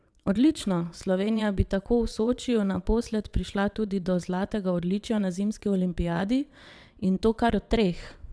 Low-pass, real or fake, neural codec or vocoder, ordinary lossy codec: none; fake; vocoder, 22.05 kHz, 80 mel bands, WaveNeXt; none